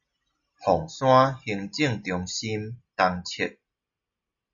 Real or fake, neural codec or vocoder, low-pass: real; none; 7.2 kHz